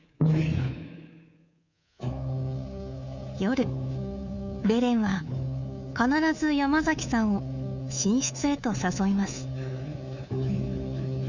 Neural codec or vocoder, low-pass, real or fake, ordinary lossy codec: codec, 24 kHz, 3.1 kbps, DualCodec; 7.2 kHz; fake; none